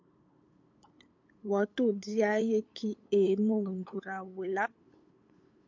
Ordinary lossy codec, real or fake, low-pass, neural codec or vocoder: MP3, 48 kbps; fake; 7.2 kHz; codec, 16 kHz, 8 kbps, FunCodec, trained on LibriTTS, 25 frames a second